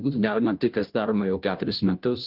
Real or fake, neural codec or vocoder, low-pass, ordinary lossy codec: fake; codec, 16 kHz, 0.5 kbps, FunCodec, trained on Chinese and English, 25 frames a second; 5.4 kHz; Opus, 32 kbps